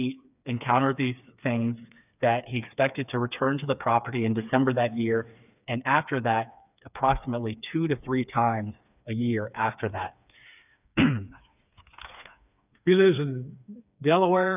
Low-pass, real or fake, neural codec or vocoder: 3.6 kHz; fake; codec, 16 kHz, 4 kbps, FreqCodec, smaller model